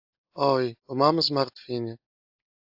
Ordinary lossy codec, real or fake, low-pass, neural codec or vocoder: AAC, 48 kbps; real; 5.4 kHz; none